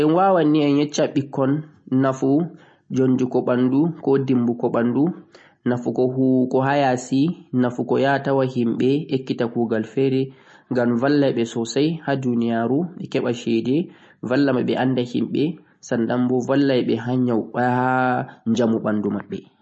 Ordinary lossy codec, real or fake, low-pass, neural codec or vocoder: MP3, 32 kbps; real; 9.9 kHz; none